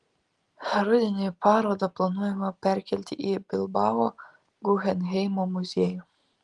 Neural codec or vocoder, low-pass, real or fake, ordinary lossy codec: none; 10.8 kHz; real; Opus, 24 kbps